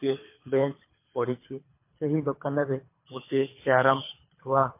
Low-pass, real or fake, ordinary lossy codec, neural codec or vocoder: 3.6 kHz; fake; MP3, 24 kbps; codec, 24 kHz, 3 kbps, HILCodec